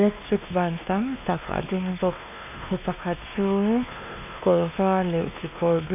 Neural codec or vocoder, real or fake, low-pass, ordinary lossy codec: codec, 16 kHz, 2 kbps, X-Codec, WavLM features, trained on Multilingual LibriSpeech; fake; 3.6 kHz; AAC, 32 kbps